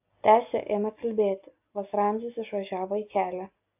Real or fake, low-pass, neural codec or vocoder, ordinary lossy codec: real; 3.6 kHz; none; AAC, 32 kbps